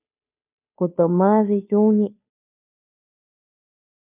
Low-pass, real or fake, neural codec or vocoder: 3.6 kHz; fake; codec, 16 kHz, 2 kbps, FunCodec, trained on Chinese and English, 25 frames a second